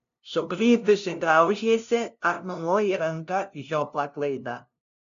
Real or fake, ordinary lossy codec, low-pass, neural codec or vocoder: fake; AAC, 96 kbps; 7.2 kHz; codec, 16 kHz, 0.5 kbps, FunCodec, trained on LibriTTS, 25 frames a second